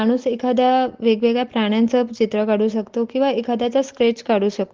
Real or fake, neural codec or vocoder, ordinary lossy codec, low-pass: real; none; Opus, 16 kbps; 7.2 kHz